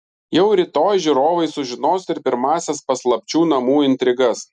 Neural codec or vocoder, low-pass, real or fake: none; 10.8 kHz; real